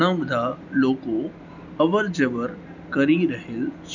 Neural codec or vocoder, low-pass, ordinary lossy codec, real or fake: none; 7.2 kHz; none; real